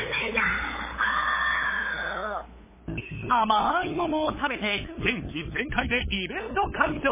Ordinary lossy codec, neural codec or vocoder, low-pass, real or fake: MP3, 16 kbps; codec, 16 kHz, 8 kbps, FunCodec, trained on LibriTTS, 25 frames a second; 3.6 kHz; fake